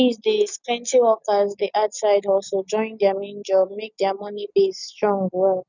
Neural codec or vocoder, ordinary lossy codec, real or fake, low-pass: none; none; real; 7.2 kHz